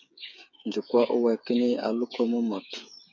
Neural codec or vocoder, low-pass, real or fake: codec, 16 kHz, 8 kbps, FreqCodec, smaller model; 7.2 kHz; fake